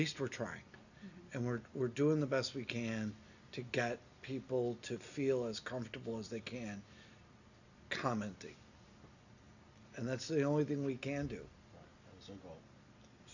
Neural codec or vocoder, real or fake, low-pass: none; real; 7.2 kHz